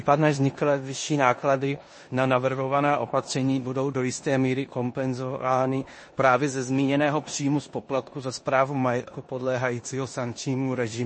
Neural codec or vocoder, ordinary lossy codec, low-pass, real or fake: codec, 16 kHz in and 24 kHz out, 0.9 kbps, LongCat-Audio-Codec, four codebook decoder; MP3, 32 kbps; 9.9 kHz; fake